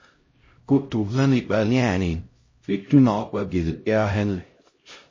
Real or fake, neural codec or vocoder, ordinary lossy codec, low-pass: fake; codec, 16 kHz, 0.5 kbps, X-Codec, HuBERT features, trained on LibriSpeech; MP3, 32 kbps; 7.2 kHz